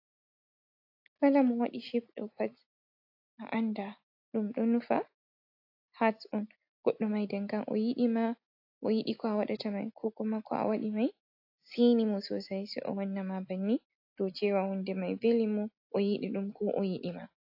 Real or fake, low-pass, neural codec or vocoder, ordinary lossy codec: real; 5.4 kHz; none; AAC, 32 kbps